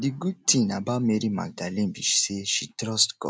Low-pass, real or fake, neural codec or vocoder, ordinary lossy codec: none; real; none; none